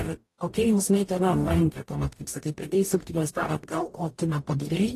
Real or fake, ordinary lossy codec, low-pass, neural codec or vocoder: fake; AAC, 48 kbps; 14.4 kHz; codec, 44.1 kHz, 0.9 kbps, DAC